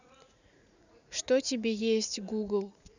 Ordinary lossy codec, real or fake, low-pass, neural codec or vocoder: none; fake; 7.2 kHz; vocoder, 44.1 kHz, 80 mel bands, Vocos